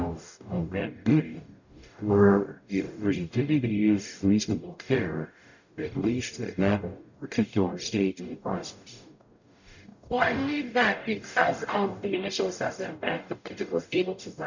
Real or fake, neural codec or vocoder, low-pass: fake; codec, 44.1 kHz, 0.9 kbps, DAC; 7.2 kHz